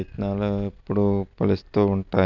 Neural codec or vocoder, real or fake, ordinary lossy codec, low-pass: none; real; none; 7.2 kHz